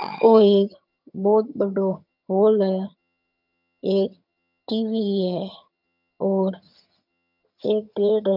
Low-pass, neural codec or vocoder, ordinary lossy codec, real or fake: 5.4 kHz; vocoder, 22.05 kHz, 80 mel bands, HiFi-GAN; none; fake